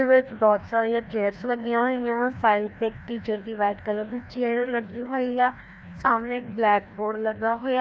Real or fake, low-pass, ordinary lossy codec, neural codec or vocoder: fake; none; none; codec, 16 kHz, 1 kbps, FreqCodec, larger model